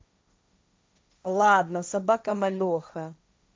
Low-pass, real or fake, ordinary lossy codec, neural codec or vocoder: none; fake; none; codec, 16 kHz, 1.1 kbps, Voila-Tokenizer